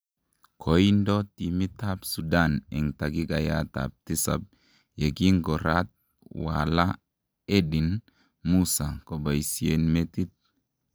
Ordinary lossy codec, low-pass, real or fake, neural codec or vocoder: none; none; real; none